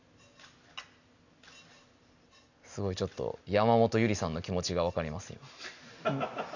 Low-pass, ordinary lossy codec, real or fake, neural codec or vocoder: 7.2 kHz; none; real; none